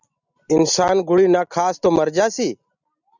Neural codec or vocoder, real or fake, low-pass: none; real; 7.2 kHz